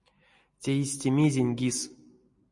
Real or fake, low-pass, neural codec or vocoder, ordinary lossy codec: real; 10.8 kHz; none; MP3, 48 kbps